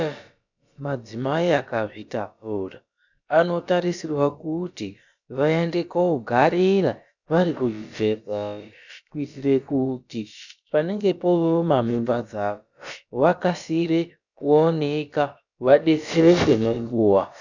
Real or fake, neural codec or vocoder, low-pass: fake; codec, 16 kHz, about 1 kbps, DyCAST, with the encoder's durations; 7.2 kHz